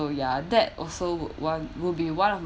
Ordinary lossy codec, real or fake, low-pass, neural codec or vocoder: none; real; none; none